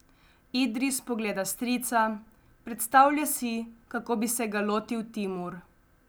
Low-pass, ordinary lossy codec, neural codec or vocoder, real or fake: none; none; none; real